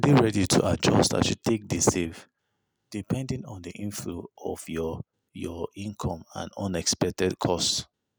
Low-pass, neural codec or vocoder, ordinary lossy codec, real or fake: none; none; none; real